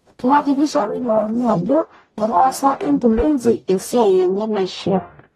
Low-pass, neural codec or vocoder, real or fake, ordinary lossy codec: 19.8 kHz; codec, 44.1 kHz, 0.9 kbps, DAC; fake; AAC, 32 kbps